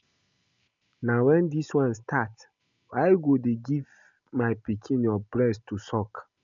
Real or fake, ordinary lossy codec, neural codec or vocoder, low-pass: real; none; none; 7.2 kHz